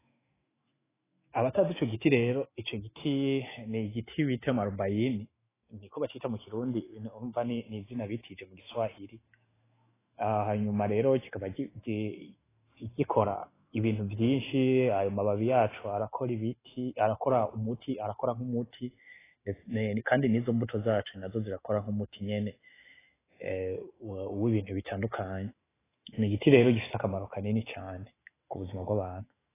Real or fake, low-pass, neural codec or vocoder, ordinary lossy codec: fake; 3.6 kHz; autoencoder, 48 kHz, 128 numbers a frame, DAC-VAE, trained on Japanese speech; AAC, 16 kbps